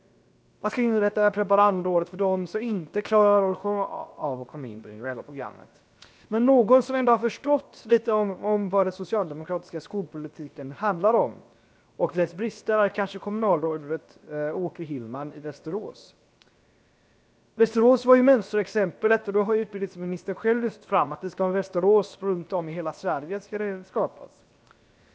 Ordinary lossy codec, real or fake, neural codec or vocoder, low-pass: none; fake; codec, 16 kHz, 0.7 kbps, FocalCodec; none